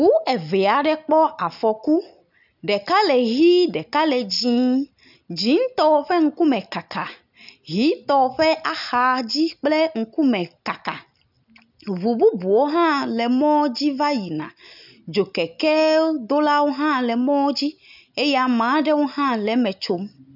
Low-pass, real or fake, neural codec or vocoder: 5.4 kHz; real; none